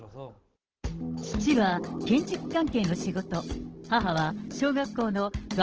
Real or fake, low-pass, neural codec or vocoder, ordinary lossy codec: fake; 7.2 kHz; codec, 16 kHz, 16 kbps, FunCodec, trained on Chinese and English, 50 frames a second; Opus, 16 kbps